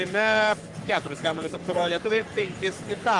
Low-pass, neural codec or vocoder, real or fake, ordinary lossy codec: 10.8 kHz; codec, 44.1 kHz, 3.4 kbps, Pupu-Codec; fake; Opus, 32 kbps